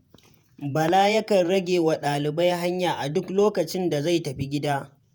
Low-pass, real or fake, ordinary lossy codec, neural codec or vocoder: none; fake; none; vocoder, 48 kHz, 128 mel bands, Vocos